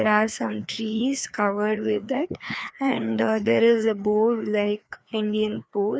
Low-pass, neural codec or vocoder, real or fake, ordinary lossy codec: none; codec, 16 kHz, 2 kbps, FreqCodec, larger model; fake; none